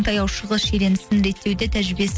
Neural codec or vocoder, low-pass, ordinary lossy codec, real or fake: none; none; none; real